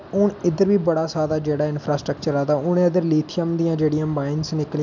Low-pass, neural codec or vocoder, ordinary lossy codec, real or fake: 7.2 kHz; none; MP3, 64 kbps; real